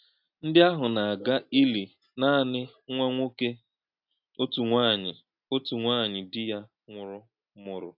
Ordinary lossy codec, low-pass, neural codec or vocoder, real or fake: AAC, 48 kbps; 5.4 kHz; none; real